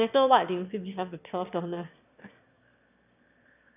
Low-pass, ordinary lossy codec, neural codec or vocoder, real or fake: 3.6 kHz; none; autoencoder, 22.05 kHz, a latent of 192 numbers a frame, VITS, trained on one speaker; fake